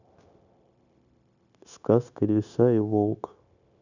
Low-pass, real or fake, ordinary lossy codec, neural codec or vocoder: 7.2 kHz; fake; none; codec, 16 kHz, 0.9 kbps, LongCat-Audio-Codec